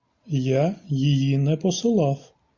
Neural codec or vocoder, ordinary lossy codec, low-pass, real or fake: none; Opus, 64 kbps; 7.2 kHz; real